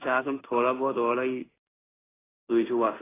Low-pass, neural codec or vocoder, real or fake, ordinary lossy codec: 3.6 kHz; codec, 16 kHz in and 24 kHz out, 1 kbps, XY-Tokenizer; fake; AAC, 16 kbps